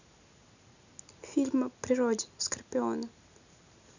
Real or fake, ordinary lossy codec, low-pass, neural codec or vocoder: real; none; 7.2 kHz; none